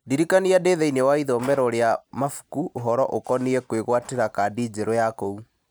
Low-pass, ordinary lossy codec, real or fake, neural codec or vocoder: none; none; real; none